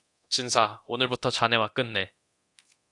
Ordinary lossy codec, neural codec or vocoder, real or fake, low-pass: AAC, 64 kbps; codec, 24 kHz, 0.9 kbps, DualCodec; fake; 10.8 kHz